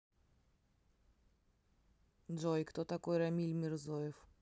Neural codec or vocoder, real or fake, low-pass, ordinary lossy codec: none; real; none; none